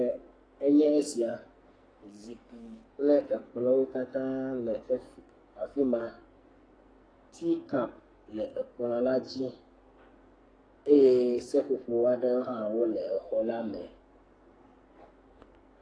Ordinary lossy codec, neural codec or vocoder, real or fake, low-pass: AAC, 32 kbps; codec, 44.1 kHz, 2.6 kbps, SNAC; fake; 9.9 kHz